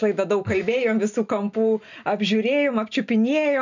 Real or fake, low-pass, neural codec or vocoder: real; 7.2 kHz; none